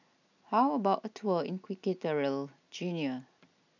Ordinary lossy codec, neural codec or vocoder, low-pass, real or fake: none; none; 7.2 kHz; real